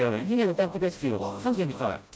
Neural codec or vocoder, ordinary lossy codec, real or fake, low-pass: codec, 16 kHz, 0.5 kbps, FreqCodec, smaller model; none; fake; none